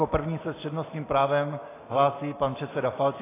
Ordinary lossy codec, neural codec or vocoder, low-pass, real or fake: AAC, 16 kbps; none; 3.6 kHz; real